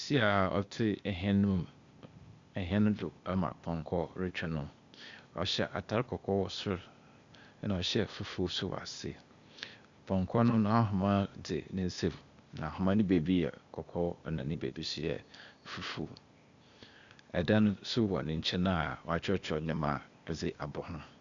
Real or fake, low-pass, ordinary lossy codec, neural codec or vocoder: fake; 7.2 kHz; Opus, 64 kbps; codec, 16 kHz, 0.8 kbps, ZipCodec